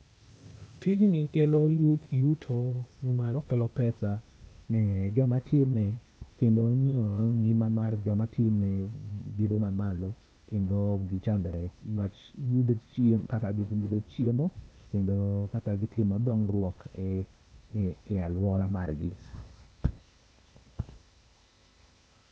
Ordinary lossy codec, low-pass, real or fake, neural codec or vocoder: none; none; fake; codec, 16 kHz, 0.8 kbps, ZipCodec